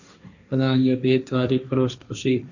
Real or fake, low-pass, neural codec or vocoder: fake; 7.2 kHz; codec, 16 kHz, 1.1 kbps, Voila-Tokenizer